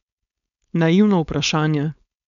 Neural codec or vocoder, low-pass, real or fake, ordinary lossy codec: codec, 16 kHz, 4.8 kbps, FACodec; 7.2 kHz; fake; none